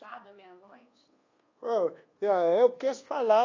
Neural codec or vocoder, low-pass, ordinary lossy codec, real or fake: codec, 24 kHz, 1.2 kbps, DualCodec; 7.2 kHz; AAC, 32 kbps; fake